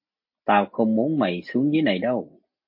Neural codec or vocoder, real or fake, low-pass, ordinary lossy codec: none; real; 5.4 kHz; MP3, 32 kbps